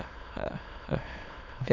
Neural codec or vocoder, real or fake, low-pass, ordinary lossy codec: autoencoder, 22.05 kHz, a latent of 192 numbers a frame, VITS, trained on many speakers; fake; 7.2 kHz; none